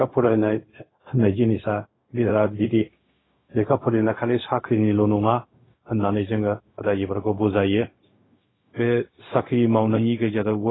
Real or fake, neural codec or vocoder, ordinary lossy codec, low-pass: fake; codec, 16 kHz, 0.4 kbps, LongCat-Audio-Codec; AAC, 16 kbps; 7.2 kHz